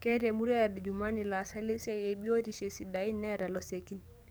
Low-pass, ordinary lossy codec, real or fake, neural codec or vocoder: none; none; fake; codec, 44.1 kHz, 7.8 kbps, DAC